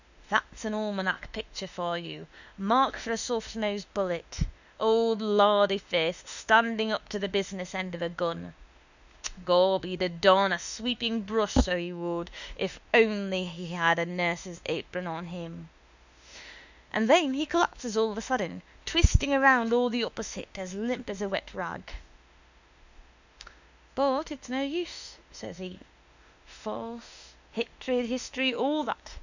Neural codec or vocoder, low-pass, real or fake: autoencoder, 48 kHz, 32 numbers a frame, DAC-VAE, trained on Japanese speech; 7.2 kHz; fake